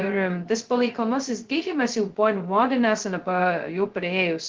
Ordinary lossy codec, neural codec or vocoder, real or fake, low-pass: Opus, 16 kbps; codec, 16 kHz, 0.2 kbps, FocalCodec; fake; 7.2 kHz